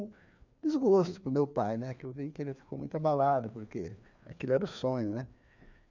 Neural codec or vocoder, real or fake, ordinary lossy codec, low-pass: codec, 16 kHz, 2 kbps, FreqCodec, larger model; fake; none; 7.2 kHz